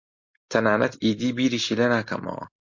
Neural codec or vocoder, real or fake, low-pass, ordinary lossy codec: none; real; 7.2 kHz; MP3, 48 kbps